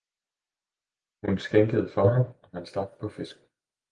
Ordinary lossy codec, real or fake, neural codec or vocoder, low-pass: Opus, 24 kbps; real; none; 9.9 kHz